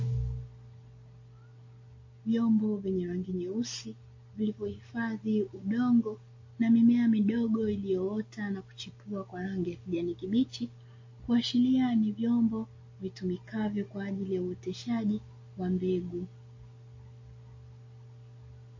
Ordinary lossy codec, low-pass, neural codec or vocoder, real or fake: MP3, 32 kbps; 7.2 kHz; none; real